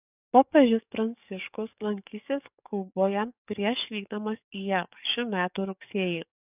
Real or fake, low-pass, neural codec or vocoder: fake; 3.6 kHz; codec, 44.1 kHz, 7.8 kbps, DAC